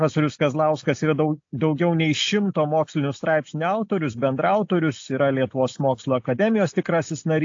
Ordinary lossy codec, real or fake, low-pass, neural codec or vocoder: AAC, 48 kbps; real; 7.2 kHz; none